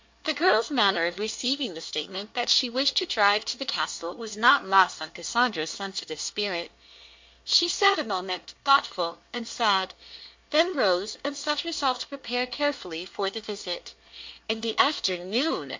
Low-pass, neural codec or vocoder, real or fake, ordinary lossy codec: 7.2 kHz; codec, 24 kHz, 1 kbps, SNAC; fake; MP3, 48 kbps